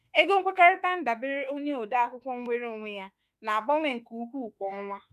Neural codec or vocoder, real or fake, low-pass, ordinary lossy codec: autoencoder, 48 kHz, 32 numbers a frame, DAC-VAE, trained on Japanese speech; fake; 14.4 kHz; none